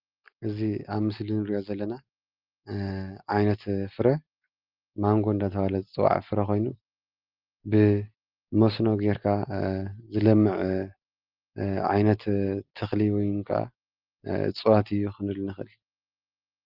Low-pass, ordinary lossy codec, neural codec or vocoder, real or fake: 5.4 kHz; Opus, 32 kbps; none; real